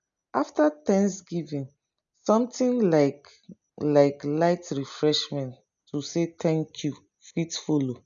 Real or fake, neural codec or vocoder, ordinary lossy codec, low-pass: real; none; none; 7.2 kHz